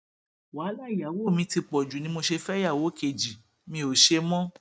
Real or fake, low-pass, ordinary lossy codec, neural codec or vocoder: real; none; none; none